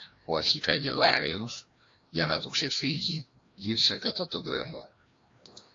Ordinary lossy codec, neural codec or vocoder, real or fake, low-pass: AAC, 48 kbps; codec, 16 kHz, 1 kbps, FreqCodec, larger model; fake; 7.2 kHz